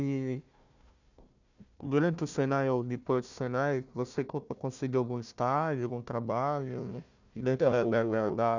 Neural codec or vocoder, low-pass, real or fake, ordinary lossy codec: codec, 16 kHz, 1 kbps, FunCodec, trained on Chinese and English, 50 frames a second; 7.2 kHz; fake; none